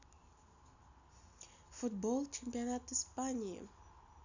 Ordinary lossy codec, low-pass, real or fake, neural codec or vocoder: none; 7.2 kHz; real; none